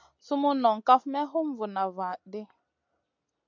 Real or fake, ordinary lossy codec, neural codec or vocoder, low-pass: real; MP3, 64 kbps; none; 7.2 kHz